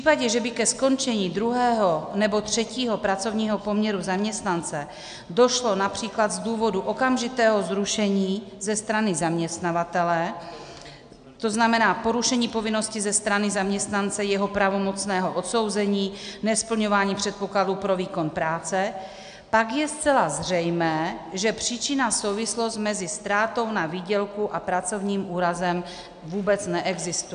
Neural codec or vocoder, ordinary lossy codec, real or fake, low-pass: none; AAC, 96 kbps; real; 9.9 kHz